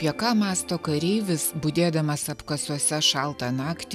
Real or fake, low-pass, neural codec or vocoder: real; 14.4 kHz; none